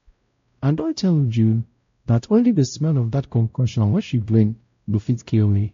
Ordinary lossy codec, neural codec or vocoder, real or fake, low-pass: AAC, 48 kbps; codec, 16 kHz, 0.5 kbps, X-Codec, WavLM features, trained on Multilingual LibriSpeech; fake; 7.2 kHz